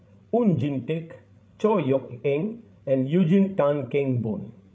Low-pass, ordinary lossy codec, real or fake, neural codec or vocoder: none; none; fake; codec, 16 kHz, 16 kbps, FreqCodec, larger model